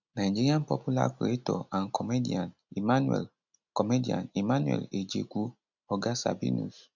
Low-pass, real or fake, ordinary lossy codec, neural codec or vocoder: 7.2 kHz; real; none; none